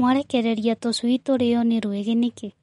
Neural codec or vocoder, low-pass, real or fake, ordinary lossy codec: none; 10.8 kHz; real; MP3, 48 kbps